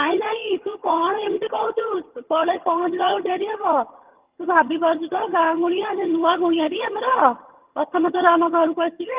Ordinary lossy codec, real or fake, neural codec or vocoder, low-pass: Opus, 32 kbps; fake; vocoder, 22.05 kHz, 80 mel bands, HiFi-GAN; 3.6 kHz